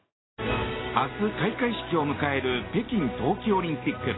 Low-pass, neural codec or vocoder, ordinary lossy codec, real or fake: 7.2 kHz; none; AAC, 16 kbps; real